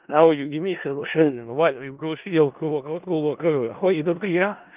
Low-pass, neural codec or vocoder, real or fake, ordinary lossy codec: 3.6 kHz; codec, 16 kHz in and 24 kHz out, 0.4 kbps, LongCat-Audio-Codec, four codebook decoder; fake; Opus, 32 kbps